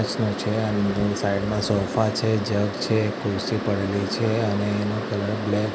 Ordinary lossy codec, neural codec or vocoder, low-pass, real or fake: none; none; none; real